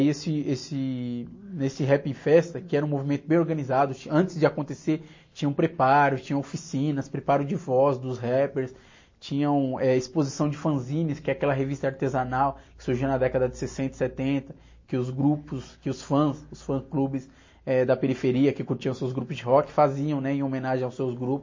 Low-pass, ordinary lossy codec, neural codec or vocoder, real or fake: 7.2 kHz; MP3, 32 kbps; none; real